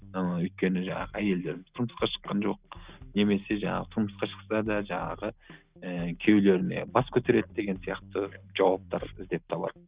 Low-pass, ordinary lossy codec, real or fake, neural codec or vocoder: 3.6 kHz; Opus, 64 kbps; real; none